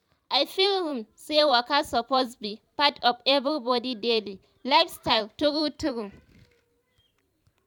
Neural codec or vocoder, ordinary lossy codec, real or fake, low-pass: vocoder, 44.1 kHz, 128 mel bands every 512 samples, BigVGAN v2; none; fake; 19.8 kHz